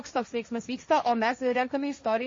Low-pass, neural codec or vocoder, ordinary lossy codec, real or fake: 7.2 kHz; codec, 16 kHz, 1.1 kbps, Voila-Tokenizer; MP3, 32 kbps; fake